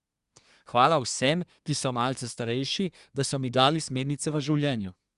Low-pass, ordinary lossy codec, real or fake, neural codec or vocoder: 10.8 kHz; Opus, 64 kbps; fake; codec, 24 kHz, 1 kbps, SNAC